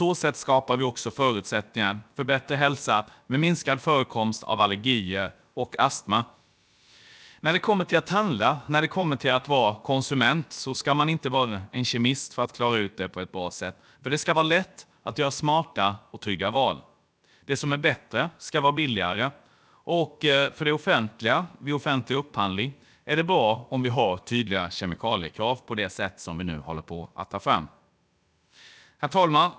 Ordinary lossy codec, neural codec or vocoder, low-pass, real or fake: none; codec, 16 kHz, about 1 kbps, DyCAST, with the encoder's durations; none; fake